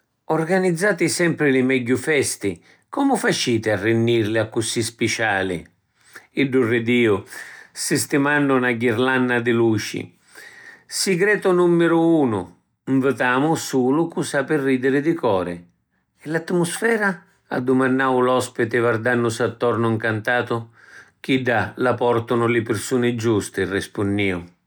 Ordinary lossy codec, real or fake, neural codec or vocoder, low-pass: none; real; none; none